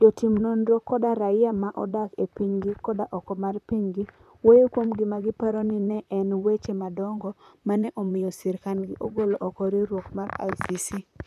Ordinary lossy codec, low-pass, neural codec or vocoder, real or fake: none; 14.4 kHz; vocoder, 44.1 kHz, 128 mel bands, Pupu-Vocoder; fake